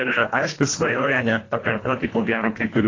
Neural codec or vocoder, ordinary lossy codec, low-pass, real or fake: codec, 24 kHz, 1.5 kbps, HILCodec; AAC, 32 kbps; 7.2 kHz; fake